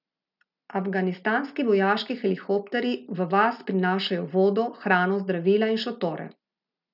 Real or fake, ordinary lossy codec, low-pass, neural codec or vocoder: real; none; 5.4 kHz; none